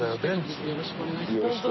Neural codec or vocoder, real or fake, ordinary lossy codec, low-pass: none; real; MP3, 24 kbps; 7.2 kHz